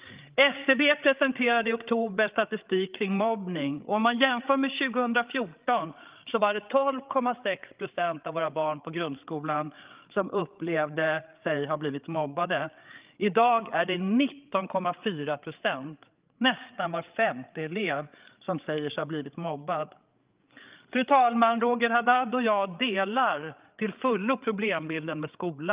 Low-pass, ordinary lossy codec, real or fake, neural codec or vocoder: 3.6 kHz; Opus, 32 kbps; fake; codec, 16 kHz, 8 kbps, FreqCodec, larger model